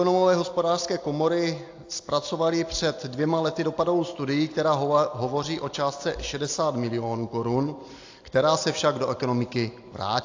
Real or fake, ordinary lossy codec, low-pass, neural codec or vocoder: real; AAC, 48 kbps; 7.2 kHz; none